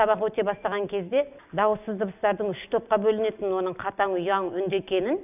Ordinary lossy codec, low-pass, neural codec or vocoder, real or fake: none; 3.6 kHz; none; real